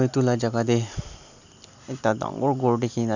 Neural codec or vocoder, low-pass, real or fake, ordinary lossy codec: none; 7.2 kHz; real; none